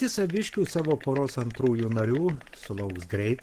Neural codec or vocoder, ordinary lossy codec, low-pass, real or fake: codec, 44.1 kHz, 7.8 kbps, Pupu-Codec; Opus, 16 kbps; 14.4 kHz; fake